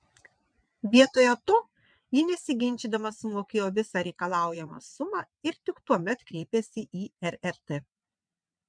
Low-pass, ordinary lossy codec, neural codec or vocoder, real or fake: 9.9 kHz; MP3, 96 kbps; vocoder, 22.05 kHz, 80 mel bands, WaveNeXt; fake